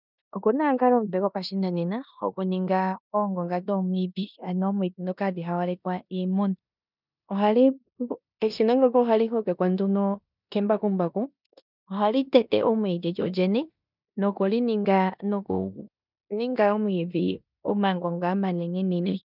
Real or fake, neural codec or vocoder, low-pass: fake; codec, 16 kHz in and 24 kHz out, 0.9 kbps, LongCat-Audio-Codec, four codebook decoder; 5.4 kHz